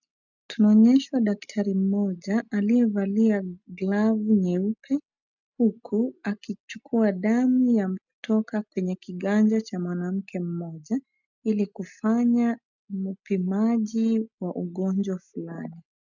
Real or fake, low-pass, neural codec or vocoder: real; 7.2 kHz; none